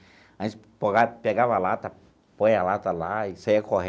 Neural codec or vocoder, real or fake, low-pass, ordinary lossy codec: none; real; none; none